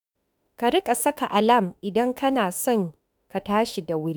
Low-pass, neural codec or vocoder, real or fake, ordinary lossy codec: none; autoencoder, 48 kHz, 32 numbers a frame, DAC-VAE, trained on Japanese speech; fake; none